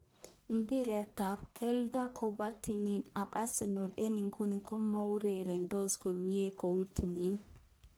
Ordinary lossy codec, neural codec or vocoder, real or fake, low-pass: none; codec, 44.1 kHz, 1.7 kbps, Pupu-Codec; fake; none